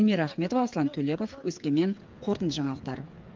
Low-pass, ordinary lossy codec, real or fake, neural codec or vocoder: 7.2 kHz; Opus, 24 kbps; fake; codec, 16 kHz, 8 kbps, FreqCodec, smaller model